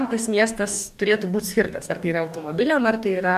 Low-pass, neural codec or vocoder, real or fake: 14.4 kHz; codec, 44.1 kHz, 2.6 kbps, DAC; fake